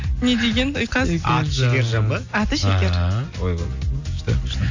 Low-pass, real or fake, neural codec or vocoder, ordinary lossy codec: 7.2 kHz; real; none; AAC, 48 kbps